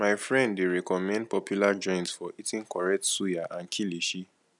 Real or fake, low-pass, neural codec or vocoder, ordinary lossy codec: real; 10.8 kHz; none; none